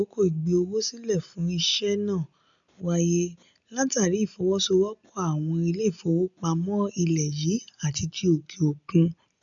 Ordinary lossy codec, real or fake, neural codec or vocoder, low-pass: none; real; none; 7.2 kHz